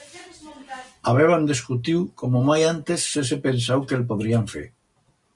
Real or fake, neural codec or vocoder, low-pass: real; none; 10.8 kHz